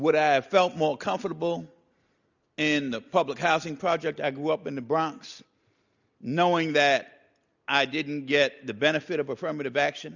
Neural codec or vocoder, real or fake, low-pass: none; real; 7.2 kHz